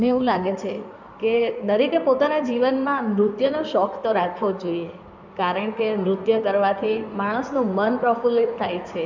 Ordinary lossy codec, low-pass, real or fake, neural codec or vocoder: none; 7.2 kHz; fake; codec, 16 kHz in and 24 kHz out, 2.2 kbps, FireRedTTS-2 codec